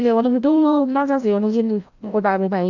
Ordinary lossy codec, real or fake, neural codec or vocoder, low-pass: none; fake; codec, 16 kHz, 0.5 kbps, FreqCodec, larger model; 7.2 kHz